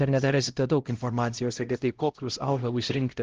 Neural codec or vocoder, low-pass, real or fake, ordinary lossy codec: codec, 16 kHz, 0.5 kbps, X-Codec, HuBERT features, trained on LibriSpeech; 7.2 kHz; fake; Opus, 16 kbps